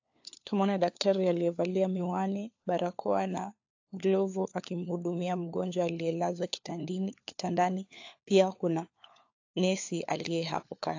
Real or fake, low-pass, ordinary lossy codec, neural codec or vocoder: fake; 7.2 kHz; AAC, 48 kbps; codec, 16 kHz, 4 kbps, FunCodec, trained on LibriTTS, 50 frames a second